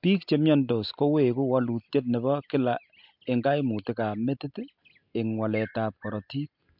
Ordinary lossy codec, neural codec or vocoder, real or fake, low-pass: MP3, 48 kbps; none; real; 5.4 kHz